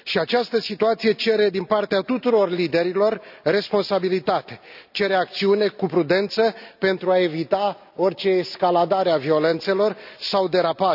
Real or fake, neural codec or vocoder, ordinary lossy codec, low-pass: real; none; none; 5.4 kHz